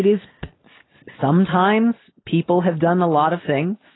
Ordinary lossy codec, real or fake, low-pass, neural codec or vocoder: AAC, 16 kbps; real; 7.2 kHz; none